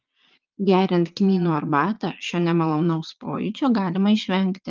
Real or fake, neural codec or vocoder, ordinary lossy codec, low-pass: fake; vocoder, 22.05 kHz, 80 mel bands, Vocos; Opus, 24 kbps; 7.2 kHz